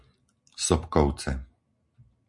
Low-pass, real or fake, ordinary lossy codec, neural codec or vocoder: 10.8 kHz; real; MP3, 64 kbps; none